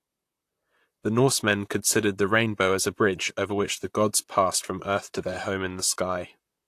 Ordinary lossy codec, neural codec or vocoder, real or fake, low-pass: AAC, 64 kbps; vocoder, 44.1 kHz, 128 mel bands, Pupu-Vocoder; fake; 14.4 kHz